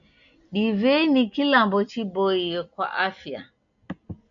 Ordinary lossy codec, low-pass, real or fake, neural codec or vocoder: MP3, 96 kbps; 7.2 kHz; real; none